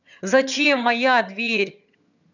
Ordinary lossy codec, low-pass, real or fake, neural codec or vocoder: none; 7.2 kHz; fake; vocoder, 22.05 kHz, 80 mel bands, HiFi-GAN